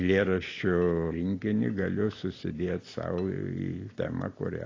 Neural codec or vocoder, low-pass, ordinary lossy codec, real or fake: none; 7.2 kHz; AAC, 32 kbps; real